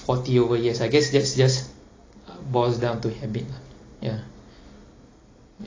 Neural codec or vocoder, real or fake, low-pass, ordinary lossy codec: none; real; 7.2 kHz; AAC, 32 kbps